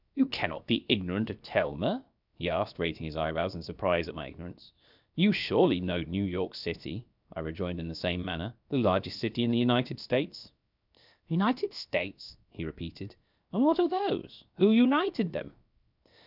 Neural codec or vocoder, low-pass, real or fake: codec, 16 kHz, 0.7 kbps, FocalCodec; 5.4 kHz; fake